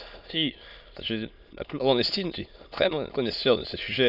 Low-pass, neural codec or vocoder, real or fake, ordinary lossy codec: 5.4 kHz; autoencoder, 22.05 kHz, a latent of 192 numbers a frame, VITS, trained on many speakers; fake; none